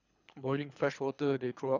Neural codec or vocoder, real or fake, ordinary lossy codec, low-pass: codec, 24 kHz, 3 kbps, HILCodec; fake; none; 7.2 kHz